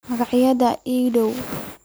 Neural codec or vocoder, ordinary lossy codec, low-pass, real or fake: none; none; none; real